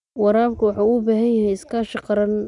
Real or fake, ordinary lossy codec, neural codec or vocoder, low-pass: real; none; none; 10.8 kHz